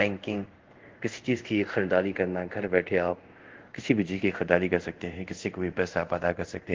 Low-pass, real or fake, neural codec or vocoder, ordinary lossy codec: 7.2 kHz; fake; codec, 24 kHz, 0.5 kbps, DualCodec; Opus, 16 kbps